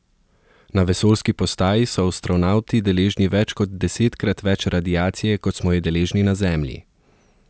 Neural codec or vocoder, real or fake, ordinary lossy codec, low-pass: none; real; none; none